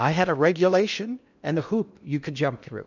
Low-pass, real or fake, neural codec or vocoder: 7.2 kHz; fake; codec, 16 kHz in and 24 kHz out, 0.8 kbps, FocalCodec, streaming, 65536 codes